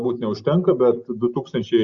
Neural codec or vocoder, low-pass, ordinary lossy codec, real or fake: none; 7.2 kHz; Opus, 64 kbps; real